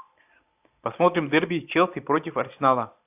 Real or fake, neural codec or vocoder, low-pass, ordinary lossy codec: real; none; 3.6 kHz; AAC, 32 kbps